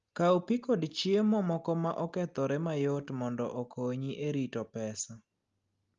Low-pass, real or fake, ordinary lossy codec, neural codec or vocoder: 7.2 kHz; real; Opus, 32 kbps; none